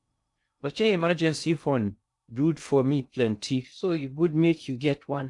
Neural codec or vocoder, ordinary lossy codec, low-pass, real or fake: codec, 16 kHz in and 24 kHz out, 0.6 kbps, FocalCodec, streaming, 2048 codes; AAC, 64 kbps; 10.8 kHz; fake